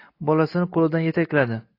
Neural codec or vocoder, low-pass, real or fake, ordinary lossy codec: none; 5.4 kHz; real; AAC, 32 kbps